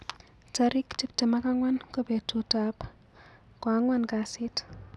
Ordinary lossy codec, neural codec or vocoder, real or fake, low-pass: none; none; real; none